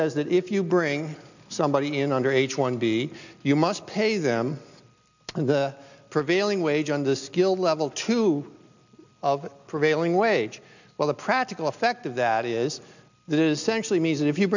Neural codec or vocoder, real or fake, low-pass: none; real; 7.2 kHz